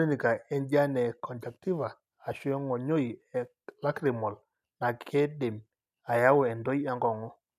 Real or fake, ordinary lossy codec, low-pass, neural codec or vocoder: real; none; 14.4 kHz; none